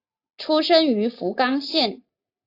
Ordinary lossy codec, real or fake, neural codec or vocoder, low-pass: AAC, 32 kbps; real; none; 5.4 kHz